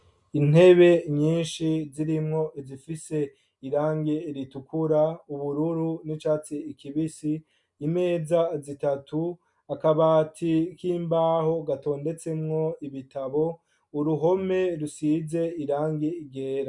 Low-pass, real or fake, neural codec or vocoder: 10.8 kHz; real; none